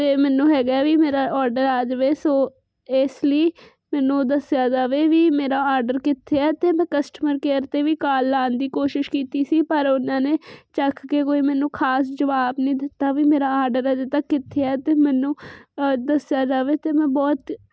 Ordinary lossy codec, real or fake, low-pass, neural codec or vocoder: none; real; none; none